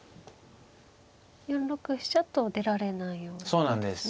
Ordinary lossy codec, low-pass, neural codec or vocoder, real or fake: none; none; none; real